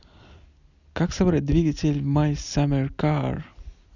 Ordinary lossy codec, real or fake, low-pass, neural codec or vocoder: none; real; 7.2 kHz; none